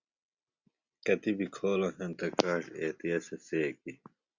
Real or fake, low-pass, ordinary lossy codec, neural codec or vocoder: real; 7.2 kHz; Opus, 64 kbps; none